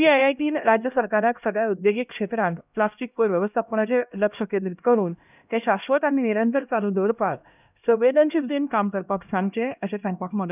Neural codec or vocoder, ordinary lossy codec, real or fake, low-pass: codec, 16 kHz, 1 kbps, X-Codec, HuBERT features, trained on LibriSpeech; none; fake; 3.6 kHz